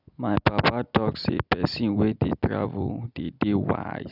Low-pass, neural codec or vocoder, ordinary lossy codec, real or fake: 5.4 kHz; none; none; real